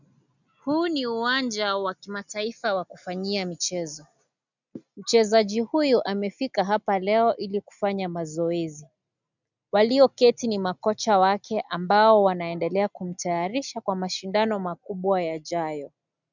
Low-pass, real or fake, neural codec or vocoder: 7.2 kHz; real; none